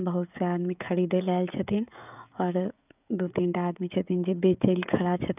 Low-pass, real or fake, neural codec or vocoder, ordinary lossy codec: 3.6 kHz; fake; codec, 16 kHz, 16 kbps, FreqCodec, smaller model; none